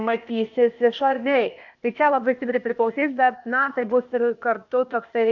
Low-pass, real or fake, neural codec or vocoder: 7.2 kHz; fake; codec, 16 kHz, 0.8 kbps, ZipCodec